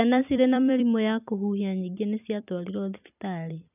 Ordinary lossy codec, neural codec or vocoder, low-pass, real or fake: none; vocoder, 44.1 kHz, 128 mel bands every 256 samples, BigVGAN v2; 3.6 kHz; fake